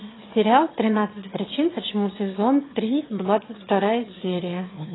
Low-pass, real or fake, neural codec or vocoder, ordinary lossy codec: 7.2 kHz; fake; autoencoder, 22.05 kHz, a latent of 192 numbers a frame, VITS, trained on one speaker; AAC, 16 kbps